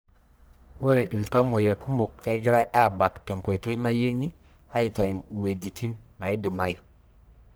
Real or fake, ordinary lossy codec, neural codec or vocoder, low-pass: fake; none; codec, 44.1 kHz, 1.7 kbps, Pupu-Codec; none